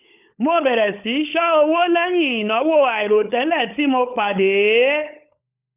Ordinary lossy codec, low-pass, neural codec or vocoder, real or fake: none; 3.6 kHz; codec, 16 kHz, 16 kbps, FunCodec, trained on LibriTTS, 50 frames a second; fake